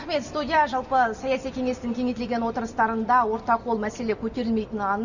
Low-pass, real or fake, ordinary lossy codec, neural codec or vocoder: 7.2 kHz; real; none; none